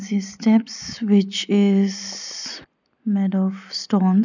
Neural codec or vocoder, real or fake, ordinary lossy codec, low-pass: none; real; none; 7.2 kHz